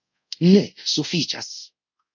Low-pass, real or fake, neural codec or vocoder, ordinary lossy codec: 7.2 kHz; fake; codec, 24 kHz, 0.5 kbps, DualCodec; MP3, 48 kbps